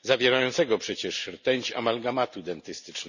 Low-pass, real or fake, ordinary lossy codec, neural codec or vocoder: 7.2 kHz; real; none; none